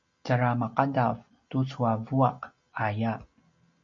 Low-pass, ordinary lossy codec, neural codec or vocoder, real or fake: 7.2 kHz; MP3, 48 kbps; none; real